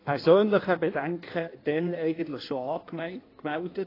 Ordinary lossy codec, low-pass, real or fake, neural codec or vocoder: AAC, 24 kbps; 5.4 kHz; fake; codec, 16 kHz in and 24 kHz out, 1.1 kbps, FireRedTTS-2 codec